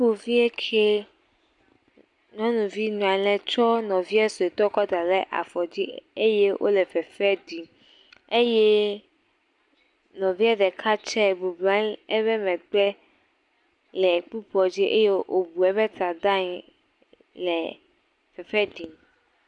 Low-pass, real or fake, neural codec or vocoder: 10.8 kHz; real; none